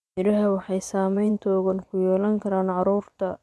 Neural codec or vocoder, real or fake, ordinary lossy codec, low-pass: vocoder, 24 kHz, 100 mel bands, Vocos; fake; none; none